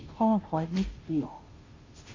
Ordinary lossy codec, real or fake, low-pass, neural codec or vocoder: Opus, 24 kbps; fake; 7.2 kHz; codec, 16 kHz, 0.5 kbps, FunCodec, trained on Chinese and English, 25 frames a second